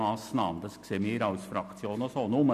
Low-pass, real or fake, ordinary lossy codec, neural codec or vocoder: 14.4 kHz; real; none; none